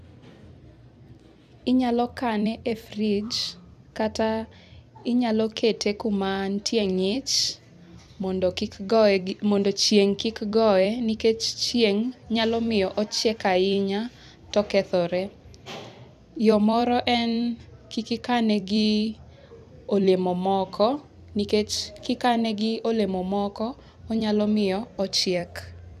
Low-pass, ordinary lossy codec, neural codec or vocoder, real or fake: 14.4 kHz; none; vocoder, 44.1 kHz, 128 mel bands every 256 samples, BigVGAN v2; fake